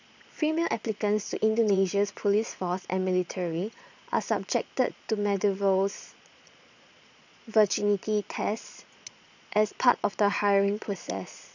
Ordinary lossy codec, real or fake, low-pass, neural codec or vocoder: none; fake; 7.2 kHz; vocoder, 22.05 kHz, 80 mel bands, Vocos